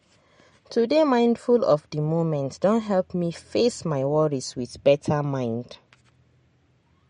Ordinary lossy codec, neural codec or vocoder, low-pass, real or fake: MP3, 48 kbps; none; 9.9 kHz; real